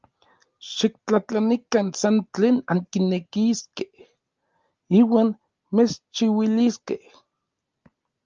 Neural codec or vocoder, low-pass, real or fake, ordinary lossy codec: none; 7.2 kHz; real; Opus, 24 kbps